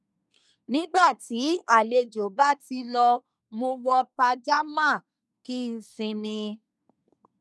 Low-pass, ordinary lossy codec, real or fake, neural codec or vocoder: none; none; fake; codec, 24 kHz, 1 kbps, SNAC